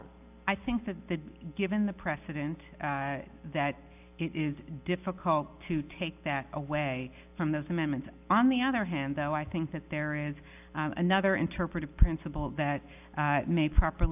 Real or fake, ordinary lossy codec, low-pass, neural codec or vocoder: real; AAC, 32 kbps; 3.6 kHz; none